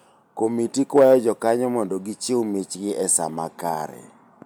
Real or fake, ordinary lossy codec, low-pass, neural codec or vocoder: real; none; none; none